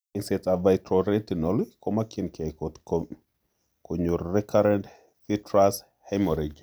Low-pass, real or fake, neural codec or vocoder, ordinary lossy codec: none; real; none; none